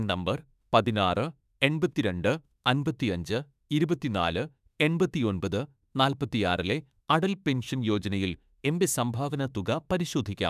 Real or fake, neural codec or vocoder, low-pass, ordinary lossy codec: fake; autoencoder, 48 kHz, 32 numbers a frame, DAC-VAE, trained on Japanese speech; 14.4 kHz; none